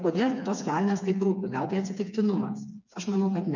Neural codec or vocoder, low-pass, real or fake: codec, 16 kHz, 4 kbps, FreqCodec, smaller model; 7.2 kHz; fake